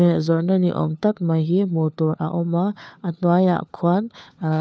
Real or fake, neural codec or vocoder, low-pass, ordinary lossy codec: fake; codec, 16 kHz, 4 kbps, FunCodec, trained on LibriTTS, 50 frames a second; none; none